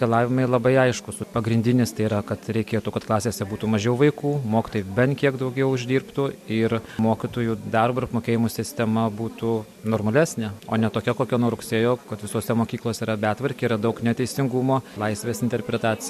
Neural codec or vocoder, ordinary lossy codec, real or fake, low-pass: none; MP3, 64 kbps; real; 14.4 kHz